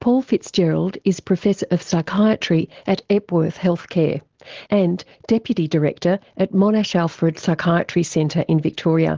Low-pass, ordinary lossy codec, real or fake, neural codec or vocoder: 7.2 kHz; Opus, 16 kbps; real; none